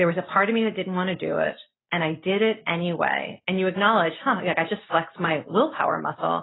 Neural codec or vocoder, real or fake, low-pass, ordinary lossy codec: none; real; 7.2 kHz; AAC, 16 kbps